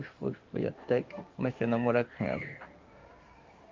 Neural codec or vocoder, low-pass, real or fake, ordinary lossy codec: codec, 16 kHz in and 24 kHz out, 1 kbps, XY-Tokenizer; 7.2 kHz; fake; Opus, 24 kbps